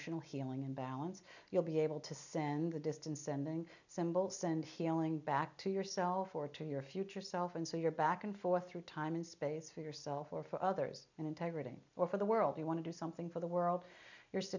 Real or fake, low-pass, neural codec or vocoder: real; 7.2 kHz; none